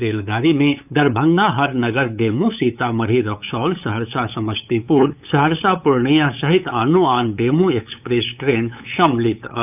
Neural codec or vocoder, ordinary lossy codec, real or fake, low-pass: codec, 16 kHz, 8 kbps, FunCodec, trained on LibriTTS, 25 frames a second; none; fake; 3.6 kHz